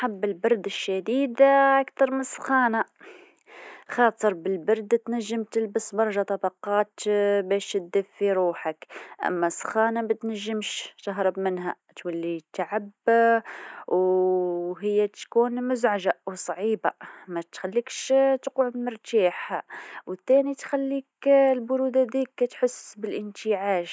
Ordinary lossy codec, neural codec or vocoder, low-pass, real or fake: none; none; none; real